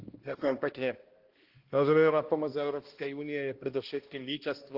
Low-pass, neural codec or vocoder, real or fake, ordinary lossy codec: 5.4 kHz; codec, 16 kHz, 1 kbps, X-Codec, HuBERT features, trained on balanced general audio; fake; Opus, 16 kbps